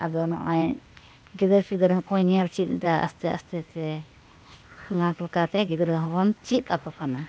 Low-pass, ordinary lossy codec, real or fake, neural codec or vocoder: none; none; fake; codec, 16 kHz, 0.8 kbps, ZipCodec